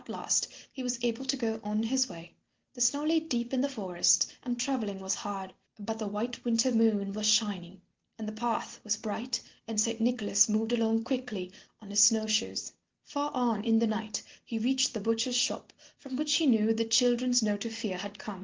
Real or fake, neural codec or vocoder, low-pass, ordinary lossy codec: real; none; 7.2 kHz; Opus, 16 kbps